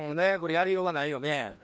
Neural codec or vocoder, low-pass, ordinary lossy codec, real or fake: codec, 16 kHz, 1 kbps, FreqCodec, larger model; none; none; fake